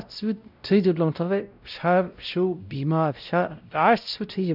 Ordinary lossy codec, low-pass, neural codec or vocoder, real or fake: none; 5.4 kHz; codec, 16 kHz, 0.5 kbps, X-Codec, WavLM features, trained on Multilingual LibriSpeech; fake